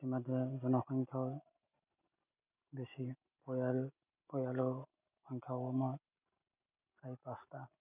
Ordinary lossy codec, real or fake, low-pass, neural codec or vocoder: none; real; 3.6 kHz; none